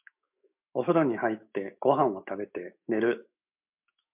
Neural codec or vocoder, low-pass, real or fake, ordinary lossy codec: autoencoder, 48 kHz, 128 numbers a frame, DAC-VAE, trained on Japanese speech; 3.6 kHz; fake; MP3, 32 kbps